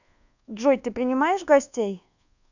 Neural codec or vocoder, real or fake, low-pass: codec, 24 kHz, 1.2 kbps, DualCodec; fake; 7.2 kHz